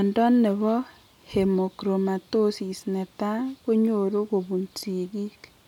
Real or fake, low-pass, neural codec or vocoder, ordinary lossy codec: real; 19.8 kHz; none; none